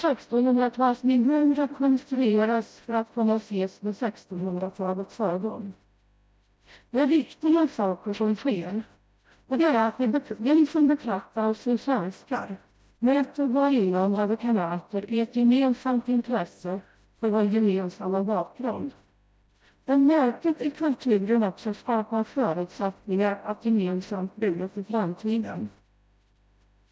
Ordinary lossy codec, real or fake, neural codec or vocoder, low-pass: none; fake; codec, 16 kHz, 0.5 kbps, FreqCodec, smaller model; none